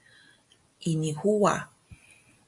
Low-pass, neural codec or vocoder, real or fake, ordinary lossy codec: 10.8 kHz; none; real; MP3, 96 kbps